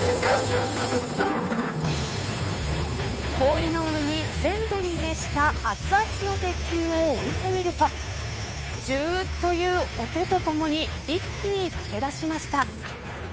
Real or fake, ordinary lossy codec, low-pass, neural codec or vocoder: fake; none; none; codec, 16 kHz, 2 kbps, FunCodec, trained on Chinese and English, 25 frames a second